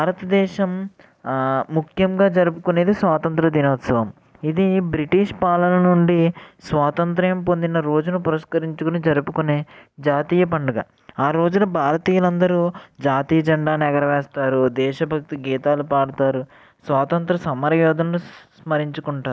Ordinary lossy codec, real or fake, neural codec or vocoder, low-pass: none; real; none; none